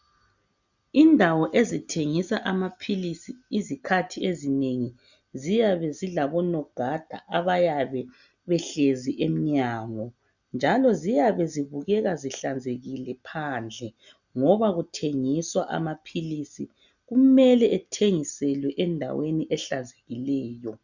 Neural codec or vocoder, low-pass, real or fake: none; 7.2 kHz; real